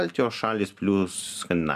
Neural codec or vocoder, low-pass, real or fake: none; 14.4 kHz; real